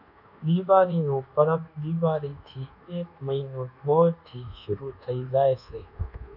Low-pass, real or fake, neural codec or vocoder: 5.4 kHz; fake; codec, 24 kHz, 1.2 kbps, DualCodec